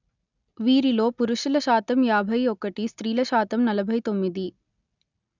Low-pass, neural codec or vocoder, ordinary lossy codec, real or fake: 7.2 kHz; none; none; real